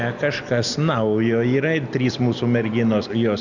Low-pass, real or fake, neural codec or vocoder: 7.2 kHz; real; none